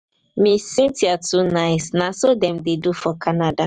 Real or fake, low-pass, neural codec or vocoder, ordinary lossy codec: fake; 9.9 kHz; vocoder, 44.1 kHz, 128 mel bands every 512 samples, BigVGAN v2; Opus, 64 kbps